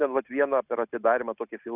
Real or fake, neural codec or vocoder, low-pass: real; none; 3.6 kHz